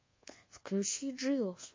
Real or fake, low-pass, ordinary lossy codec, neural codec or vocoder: fake; 7.2 kHz; MP3, 32 kbps; codec, 24 kHz, 1.2 kbps, DualCodec